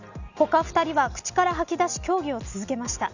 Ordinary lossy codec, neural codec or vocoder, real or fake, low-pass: none; none; real; 7.2 kHz